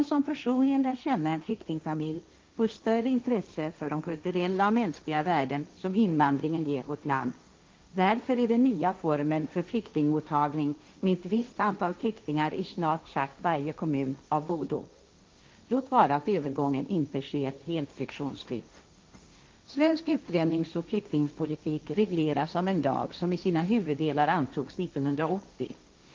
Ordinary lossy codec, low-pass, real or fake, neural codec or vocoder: Opus, 32 kbps; 7.2 kHz; fake; codec, 16 kHz, 1.1 kbps, Voila-Tokenizer